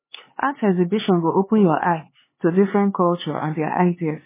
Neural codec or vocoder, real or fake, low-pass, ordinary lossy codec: codec, 16 kHz, 4 kbps, X-Codec, HuBERT features, trained on LibriSpeech; fake; 3.6 kHz; MP3, 16 kbps